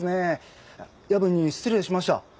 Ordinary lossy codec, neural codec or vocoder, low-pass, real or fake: none; none; none; real